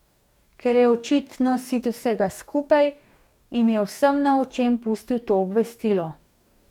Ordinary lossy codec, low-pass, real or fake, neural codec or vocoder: none; 19.8 kHz; fake; codec, 44.1 kHz, 2.6 kbps, DAC